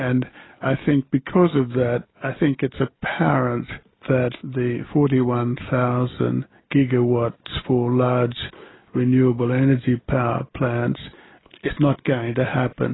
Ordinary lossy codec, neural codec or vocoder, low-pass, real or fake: AAC, 16 kbps; none; 7.2 kHz; real